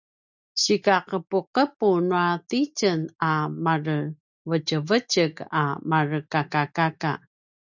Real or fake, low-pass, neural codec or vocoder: real; 7.2 kHz; none